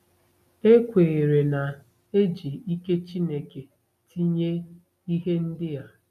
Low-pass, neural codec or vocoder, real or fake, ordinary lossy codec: 14.4 kHz; none; real; none